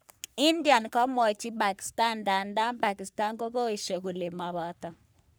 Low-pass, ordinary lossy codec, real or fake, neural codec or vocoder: none; none; fake; codec, 44.1 kHz, 3.4 kbps, Pupu-Codec